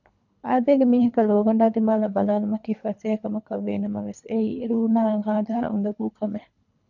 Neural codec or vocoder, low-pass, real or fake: codec, 24 kHz, 3 kbps, HILCodec; 7.2 kHz; fake